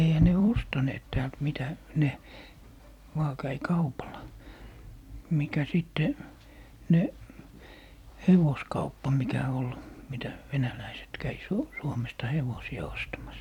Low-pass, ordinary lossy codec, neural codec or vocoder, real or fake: 19.8 kHz; none; vocoder, 44.1 kHz, 128 mel bands every 256 samples, BigVGAN v2; fake